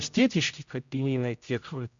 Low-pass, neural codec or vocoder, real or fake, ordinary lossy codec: 7.2 kHz; codec, 16 kHz, 0.5 kbps, X-Codec, HuBERT features, trained on general audio; fake; MP3, 64 kbps